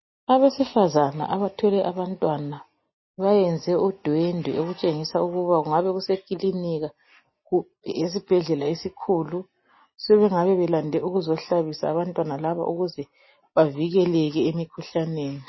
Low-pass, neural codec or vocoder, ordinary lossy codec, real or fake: 7.2 kHz; none; MP3, 24 kbps; real